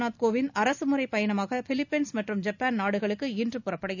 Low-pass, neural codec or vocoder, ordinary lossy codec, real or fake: 7.2 kHz; none; none; real